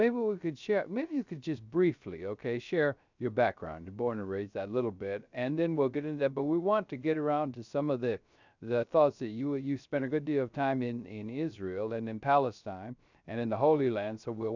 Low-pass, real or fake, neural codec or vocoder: 7.2 kHz; fake; codec, 16 kHz, 0.3 kbps, FocalCodec